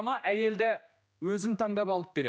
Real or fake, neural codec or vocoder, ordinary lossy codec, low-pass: fake; codec, 16 kHz, 1 kbps, X-Codec, HuBERT features, trained on general audio; none; none